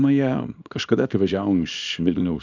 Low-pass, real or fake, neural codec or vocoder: 7.2 kHz; fake; codec, 24 kHz, 0.9 kbps, WavTokenizer, small release